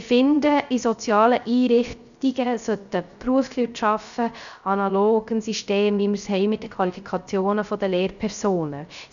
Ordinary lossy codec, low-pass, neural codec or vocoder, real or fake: none; 7.2 kHz; codec, 16 kHz, 0.3 kbps, FocalCodec; fake